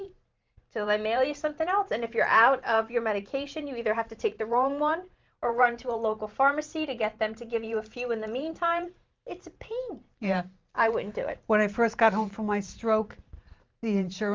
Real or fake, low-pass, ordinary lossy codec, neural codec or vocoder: fake; 7.2 kHz; Opus, 32 kbps; vocoder, 44.1 kHz, 128 mel bands every 512 samples, BigVGAN v2